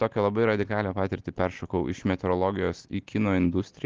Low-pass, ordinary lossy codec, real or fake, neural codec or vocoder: 7.2 kHz; Opus, 16 kbps; real; none